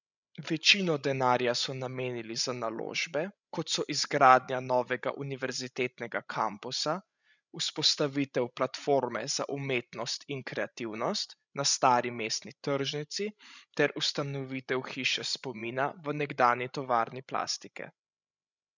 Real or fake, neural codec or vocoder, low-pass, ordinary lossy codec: fake; codec, 16 kHz, 16 kbps, FreqCodec, larger model; 7.2 kHz; none